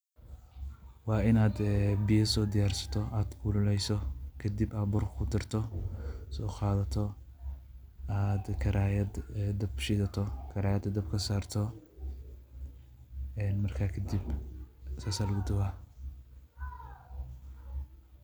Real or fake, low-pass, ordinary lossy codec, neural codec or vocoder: real; none; none; none